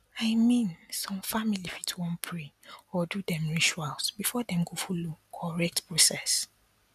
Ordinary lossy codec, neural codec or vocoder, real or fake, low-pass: none; none; real; 14.4 kHz